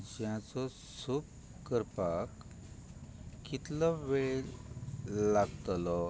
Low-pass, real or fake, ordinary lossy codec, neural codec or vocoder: none; real; none; none